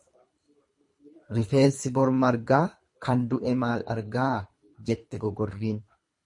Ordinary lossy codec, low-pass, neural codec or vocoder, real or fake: MP3, 48 kbps; 10.8 kHz; codec, 24 kHz, 3 kbps, HILCodec; fake